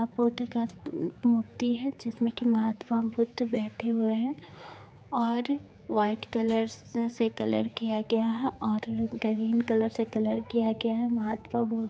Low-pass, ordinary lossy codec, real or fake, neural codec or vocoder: none; none; fake; codec, 16 kHz, 4 kbps, X-Codec, HuBERT features, trained on general audio